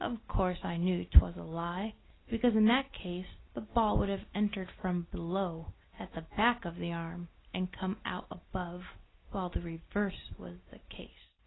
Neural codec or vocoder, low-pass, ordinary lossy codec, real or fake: none; 7.2 kHz; AAC, 16 kbps; real